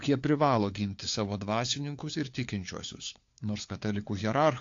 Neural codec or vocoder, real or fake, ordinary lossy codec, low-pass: codec, 16 kHz, 4 kbps, FunCodec, trained on LibriTTS, 50 frames a second; fake; AAC, 48 kbps; 7.2 kHz